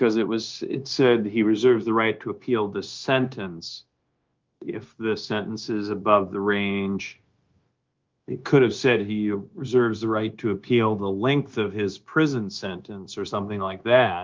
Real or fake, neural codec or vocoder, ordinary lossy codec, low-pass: fake; codec, 16 kHz, 0.9 kbps, LongCat-Audio-Codec; Opus, 32 kbps; 7.2 kHz